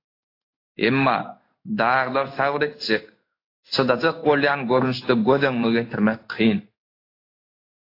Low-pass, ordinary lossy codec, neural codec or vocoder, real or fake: 5.4 kHz; AAC, 32 kbps; codec, 16 kHz in and 24 kHz out, 1 kbps, XY-Tokenizer; fake